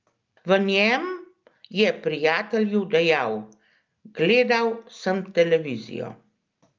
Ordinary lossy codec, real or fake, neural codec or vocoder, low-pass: Opus, 24 kbps; real; none; 7.2 kHz